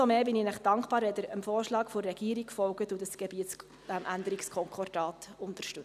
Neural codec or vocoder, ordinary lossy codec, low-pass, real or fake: none; none; 14.4 kHz; real